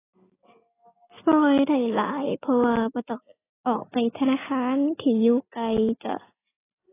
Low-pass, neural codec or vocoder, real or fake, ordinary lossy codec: 3.6 kHz; none; real; AAC, 16 kbps